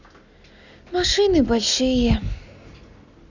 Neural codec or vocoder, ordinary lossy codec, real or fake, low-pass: none; none; real; 7.2 kHz